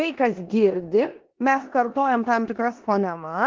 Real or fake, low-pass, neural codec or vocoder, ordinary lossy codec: fake; 7.2 kHz; codec, 16 kHz in and 24 kHz out, 0.9 kbps, LongCat-Audio-Codec, four codebook decoder; Opus, 16 kbps